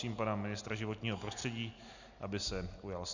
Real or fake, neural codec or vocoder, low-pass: real; none; 7.2 kHz